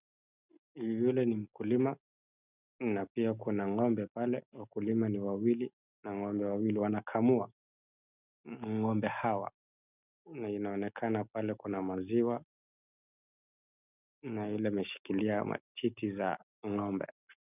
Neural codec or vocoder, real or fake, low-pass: none; real; 3.6 kHz